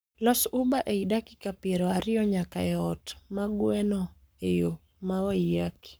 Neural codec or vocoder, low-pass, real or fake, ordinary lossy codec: codec, 44.1 kHz, 7.8 kbps, Pupu-Codec; none; fake; none